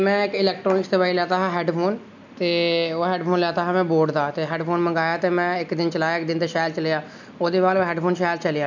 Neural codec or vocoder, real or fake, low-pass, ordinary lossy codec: none; real; 7.2 kHz; none